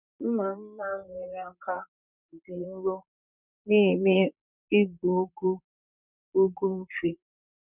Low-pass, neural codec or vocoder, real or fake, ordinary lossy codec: 3.6 kHz; vocoder, 44.1 kHz, 128 mel bands, Pupu-Vocoder; fake; none